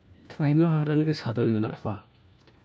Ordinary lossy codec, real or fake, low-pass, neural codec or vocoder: none; fake; none; codec, 16 kHz, 1 kbps, FunCodec, trained on LibriTTS, 50 frames a second